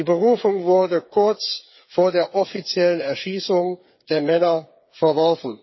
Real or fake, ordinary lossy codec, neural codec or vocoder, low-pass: fake; MP3, 24 kbps; codec, 16 kHz, 8 kbps, FreqCodec, smaller model; 7.2 kHz